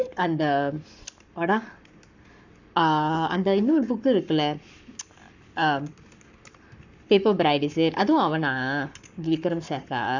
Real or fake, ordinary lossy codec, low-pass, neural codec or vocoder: fake; none; 7.2 kHz; codec, 44.1 kHz, 7.8 kbps, Pupu-Codec